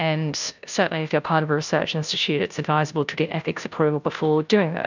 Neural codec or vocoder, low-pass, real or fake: codec, 16 kHz, 0.5 kbps, FunCodec, trained on Chinese and English, 25 frames a second; 7.2 kHz; fake